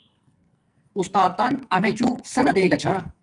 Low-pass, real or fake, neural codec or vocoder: 10.8 kHz; fake; codec, 44.1 kHz, 2.6 kbps, SNAC